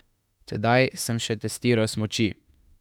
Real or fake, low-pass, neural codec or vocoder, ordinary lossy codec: fake; 19.8 kHz; autoencoder, 48 kHz, 32 numbers a frame, DAC-VAE, trained on Japanese speech; none